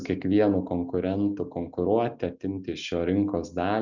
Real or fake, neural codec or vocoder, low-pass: real; none; 7.2 kHz